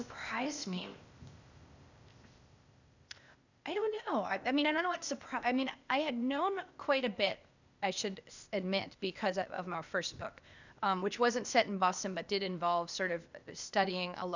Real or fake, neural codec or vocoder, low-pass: fake; codec, 16 kHz, 0.8 kbps, ZipCodec; 7.2 kHz